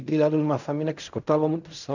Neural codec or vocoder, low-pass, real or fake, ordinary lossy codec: codec, 16 kHz in and 24 kHz out, 0.4 kbps, LongCat-Audio-Codec, fine tuned four codebook decoder; 7.2 kHz; fake; none